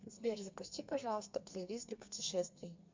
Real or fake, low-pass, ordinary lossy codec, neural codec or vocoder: fake; 7.2 kHz; MP3, 64 kbps; codec, 32 kHz, 1.9 kbps, SNAC